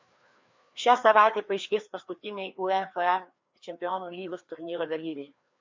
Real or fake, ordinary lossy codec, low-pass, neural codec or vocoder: fake; MP3, 48 kbps; 7.2 kHz; codec, 16 kHz, 2 kbps, FreqCodec, larger model